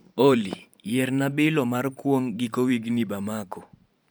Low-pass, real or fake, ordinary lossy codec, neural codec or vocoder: none; fake; none; vocoder, 44.1 kHz, 128 mel bands every 512 samples, BigVGAN v2